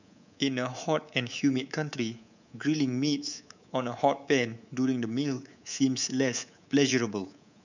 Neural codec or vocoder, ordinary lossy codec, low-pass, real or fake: codec, 24 kHz, 3.1 kbps, DualCodec; none; 7.2 kHz; fake